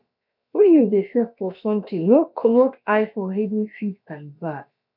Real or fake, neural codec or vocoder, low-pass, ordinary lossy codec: fake; codec, 16 kHz, about 1 kbps, DyCAST, with the encoder's durations; 5.4 kHz; none